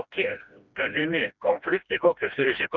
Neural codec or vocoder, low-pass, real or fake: codec, 16 kHz, 1 kbps, FreqCodec, smaller model; 7.2 kHz; fake